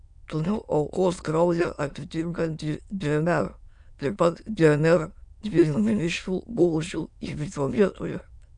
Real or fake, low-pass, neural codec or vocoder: fake; 9.9 kHz; autoencoder, 22.05 kHz, a latent of 192 numbers a frame, VITS, trained on many speakers